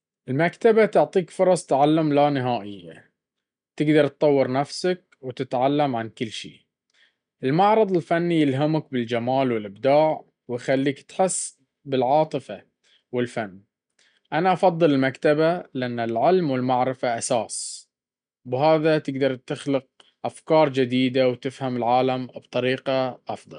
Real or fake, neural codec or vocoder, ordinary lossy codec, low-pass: real; none; none; 9.9 kHz